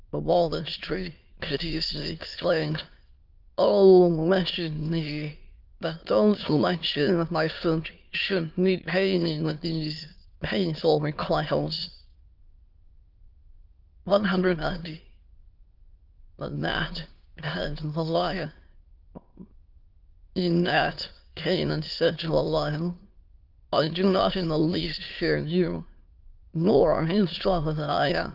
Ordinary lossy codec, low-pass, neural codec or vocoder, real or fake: Opus, 32 kbps; 5.4 kHz; autoencoder, 22.05 kHz, a latent of 192 numbers a frame, VITS, trained on many speakers; fake